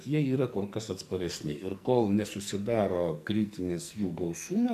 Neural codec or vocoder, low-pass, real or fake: codec, 44.1 kHz, 2.6 kbps, SNAC; 14.4 kHz; fake